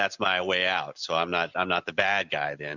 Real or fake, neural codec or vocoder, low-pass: real; none; 7.2 kHz